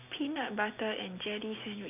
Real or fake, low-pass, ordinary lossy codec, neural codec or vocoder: real; 3.6 kHz; none; none